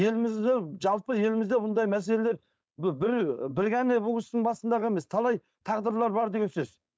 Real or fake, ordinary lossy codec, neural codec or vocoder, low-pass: fake; none; codec, 16 kHz, 4.8 kbps, FACodec; none